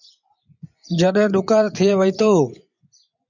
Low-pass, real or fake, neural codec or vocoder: 7.2 kHz; fake; vocoder, 24 kHz, 100 mel bands, Vocos